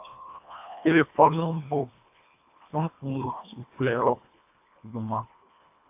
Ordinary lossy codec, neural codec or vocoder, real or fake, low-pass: AAC, 24 kbps; codec, 24 kHz, 1.5 kbps, HILCodec; fake; 3.6 kHz